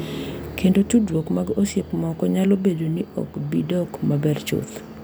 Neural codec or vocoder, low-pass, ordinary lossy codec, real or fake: none; none; none; real